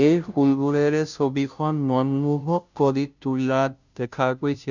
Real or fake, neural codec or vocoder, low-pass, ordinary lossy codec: fake; codec, 16 kHz, 0.5 kbps, FunCodec, trained on Chinese and English, 25 frames a second; 7.2 kHz; none